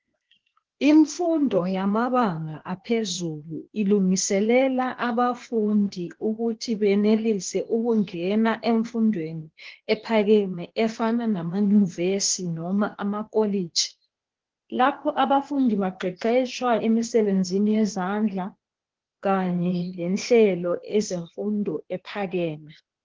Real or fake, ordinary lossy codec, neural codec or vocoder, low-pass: fake; Opus, 16 kbps; codec, 16 kHz, 0.8 kbps, ZipCodec; 7.2 kHz